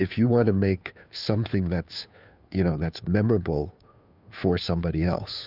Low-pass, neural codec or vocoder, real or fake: 5.4 kHz; codec, 16 kHz, 2 kbps, FunCodec, trained on Chinese and English, 25 frames a second; fake